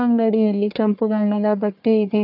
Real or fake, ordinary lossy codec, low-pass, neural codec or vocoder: fake; none; 5.4 kHz; codec, 44.1 kHz, 1.7 kbps, Pupu-Codec